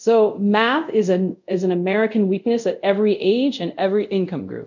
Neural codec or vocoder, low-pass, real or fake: codec, 24 kHz, 0.5 kbps, DualCodec; 7.2 kHz; fake